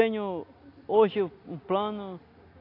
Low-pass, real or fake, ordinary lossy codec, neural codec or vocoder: 5.4 kHz; real; AAC, 32 kbps; none